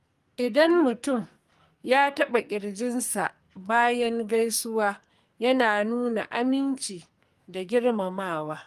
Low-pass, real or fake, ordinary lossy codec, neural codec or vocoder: 14.4 kHz; fake; Opus, 32 kbps; codec, 44.1 kHz, 2.6 kbps, SNAC